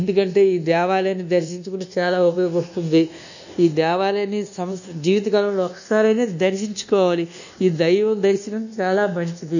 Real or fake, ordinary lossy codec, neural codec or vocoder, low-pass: fake; none; codec, 24 kHz, 1.2 kbps, DualCodec; 7.2 kHz